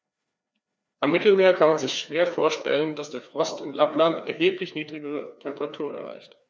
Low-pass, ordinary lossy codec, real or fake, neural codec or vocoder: none; none; fake; codec, 16 kHz, 2 kbps, FreqCodec, larger model